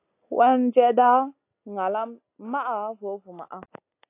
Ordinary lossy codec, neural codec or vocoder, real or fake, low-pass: AAC, 24 kbps; none; real; 3.6 kHz